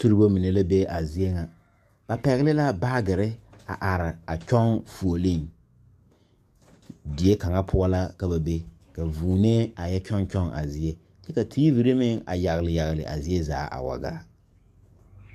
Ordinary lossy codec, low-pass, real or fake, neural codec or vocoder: MP3, 96 kbps; 14.4 kHz; fake; codec, 44.1 kHz, 7.8 kbps, DAC